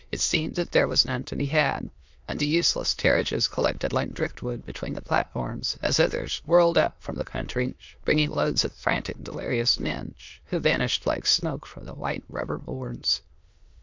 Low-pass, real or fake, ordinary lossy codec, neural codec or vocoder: 7.2 kHz; fake; MP3, 64 kbps; autoencoder, 22.05 kHz, a latent of 192 numbers a frame, VITS, trained on many speakers